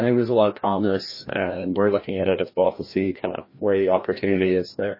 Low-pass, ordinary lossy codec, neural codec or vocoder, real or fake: 5.4 kHz; MP3, 24 kbps; codec, 16 kHz, 1 kbps, FreqCodec, larger model; fake